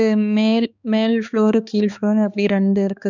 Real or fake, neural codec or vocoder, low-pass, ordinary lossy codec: fake; codec, 16 kHz, 2 kbps, X-Codec, HuBERT features, trained on balanced general audio; 7.2 kHz; none